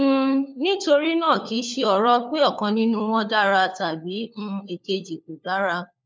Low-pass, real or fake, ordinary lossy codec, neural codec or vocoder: none; fake; none; codec, 16 kHz, 4 kbps, FunCodec, trained on LibriTTS, 50 frames a second